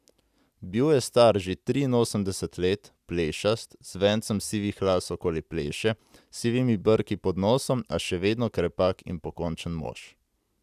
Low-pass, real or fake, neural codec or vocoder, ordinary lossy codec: 14.4 kHz; real; none; none